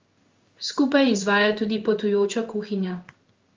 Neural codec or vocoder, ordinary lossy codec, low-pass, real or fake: codec, 16 kHz in and 24 kHz out, 1 kbps, XY-Tokenizer; Opus, 32 kbps; 7.2 kHz; fake